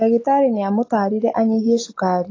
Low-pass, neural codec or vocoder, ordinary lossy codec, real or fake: 7.2 kHz; none; AAC, 32 kbps; real